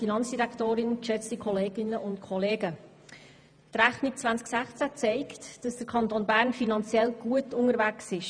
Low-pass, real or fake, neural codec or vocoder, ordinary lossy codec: 9.9 kHz; fake; vocoder, 48 kHz, 128 mel bands, Vocos; none